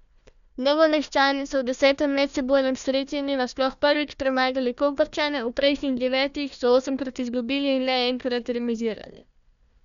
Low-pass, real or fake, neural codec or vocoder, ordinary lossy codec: 7.2 kHz; fake; codec, 16 kHz, 1 kbps, FunCodec, trained on Chinese and English, 50 frames a second; none